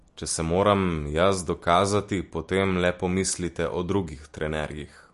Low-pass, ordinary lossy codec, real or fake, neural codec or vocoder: 14.4 kHz; MP3, 48 kbps; real; none